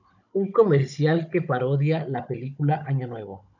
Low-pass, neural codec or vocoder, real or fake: 7.2 kHz; codec, 16 kHz, 16 kbps, FunCodec, trained on Chinese and English, 50 frames a second; fake